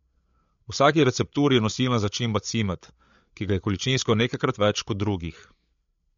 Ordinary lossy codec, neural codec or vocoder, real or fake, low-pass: MP3, 48 kbps; codec, 16 kHz, 8 kbps, FreqCodec, larger model; fake; 7.2 kHz